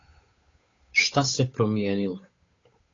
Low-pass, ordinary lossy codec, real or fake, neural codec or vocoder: 7.2 kHz; AAC, 32 kbps; fake; codec, 16 kHz, 8 kbps, FunCodec, trained on Chinese and English, 25 frames a second